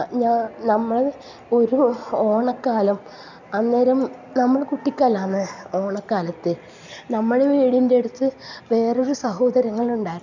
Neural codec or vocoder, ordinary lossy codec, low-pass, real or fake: none; none; 7.2 kHz; real